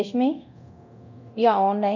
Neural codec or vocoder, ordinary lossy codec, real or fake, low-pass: codec, 24 kHz, 0.9 kbps, DualCodec; none; fake; 7.2 kHz